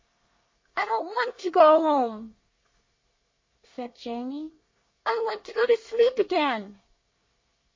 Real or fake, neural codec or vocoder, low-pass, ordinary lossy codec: fake; codec, 24 kHz, 1 kbps, SNAC; 7.2 kHz; MP3, 32 kbps